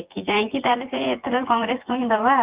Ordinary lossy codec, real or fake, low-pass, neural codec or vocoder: Opus, 24 kbps; fake; 3.6 kHz; vocoder, 24 kHz, 100 mel bands, Vocos